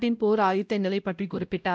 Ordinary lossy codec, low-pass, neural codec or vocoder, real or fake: none; none; codec, 16 kHz, 0.5 kbps, X-Codec, WavLM features, trained on Multilingual LibriSpeech; fake